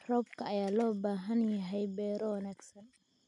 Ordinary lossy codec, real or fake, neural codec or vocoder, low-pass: none; real; none; 10.8 kHz